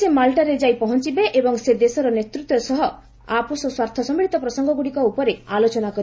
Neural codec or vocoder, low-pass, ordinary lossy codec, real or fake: none; none; none; real